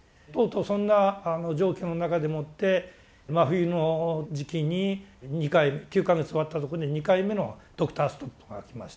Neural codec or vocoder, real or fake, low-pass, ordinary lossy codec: none; real; none; none